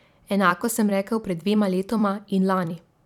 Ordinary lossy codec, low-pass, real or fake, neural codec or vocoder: none; 19.8 kHz; fake; vocoder, 44.1 kHz, 128 mel bands every 256 samples, BigVGAN v2